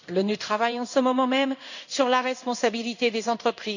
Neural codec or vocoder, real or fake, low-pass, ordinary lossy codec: codec, 16 kHz in and 24 kHz out, 1 kbps, XY-Tokenizer; fake; 7.2 kHz; none